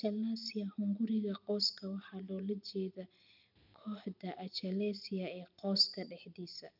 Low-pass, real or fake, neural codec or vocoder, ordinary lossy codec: 5.4 kHz; real; none; none